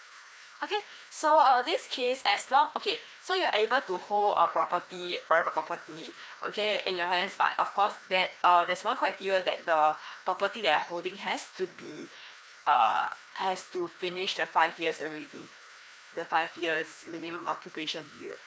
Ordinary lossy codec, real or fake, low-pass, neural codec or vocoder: none; fake; none; codec, 16 kHz, 1 kbps, FreqCodec, larger model